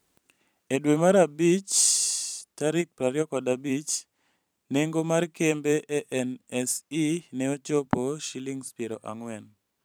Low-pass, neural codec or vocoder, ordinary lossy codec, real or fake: none; vocoder, 44.1 kHz, 128 mel bands every 512 samples, BigVGAN v2; none; fake